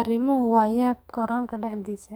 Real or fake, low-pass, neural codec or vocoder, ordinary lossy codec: fake; none; codec, 44.1 kHz, 2.6 kbps, SNAC; none